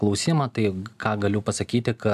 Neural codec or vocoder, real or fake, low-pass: none; real; 14.4 kHz